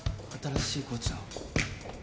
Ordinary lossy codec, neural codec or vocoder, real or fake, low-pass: none; none; real; none